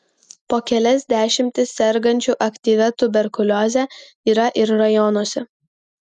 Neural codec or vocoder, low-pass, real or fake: none; 10.8 kHz; real